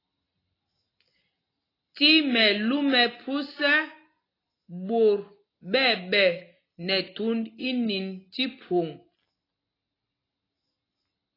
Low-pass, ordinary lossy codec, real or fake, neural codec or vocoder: 5.4 kHz; AAC, 24 kbps; real; none